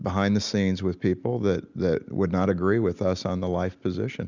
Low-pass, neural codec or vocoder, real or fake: 7.2 kHz; none; real